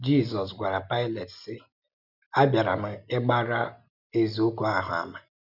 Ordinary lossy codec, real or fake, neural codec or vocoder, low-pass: none; real; none; 5.4 kHz